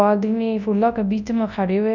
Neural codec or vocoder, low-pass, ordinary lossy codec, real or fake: codec, 24 kHz, 0.9 kbps, WavTokenizer, large speech release; 7.2 kHz; none; fake